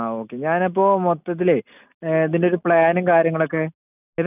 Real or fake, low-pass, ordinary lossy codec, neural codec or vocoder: real; 3.6 kHz; none; none